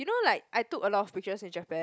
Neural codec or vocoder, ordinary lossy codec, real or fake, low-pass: none; none; real; none